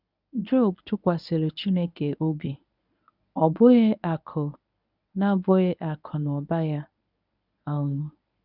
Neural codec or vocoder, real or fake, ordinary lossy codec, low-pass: codec, 24 kHz, 0.9 kbps, WavTokenizer, medium speech release version 1; fake; none; 5.4 kHz